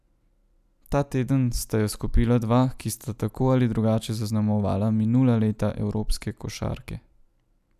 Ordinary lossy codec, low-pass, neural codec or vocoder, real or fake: none; 14.4 kHz; none; real